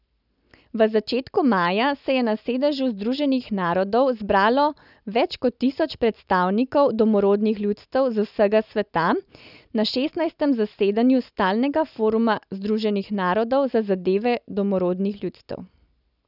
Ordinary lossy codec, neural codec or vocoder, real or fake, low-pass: none; none; real; 5.4 kHz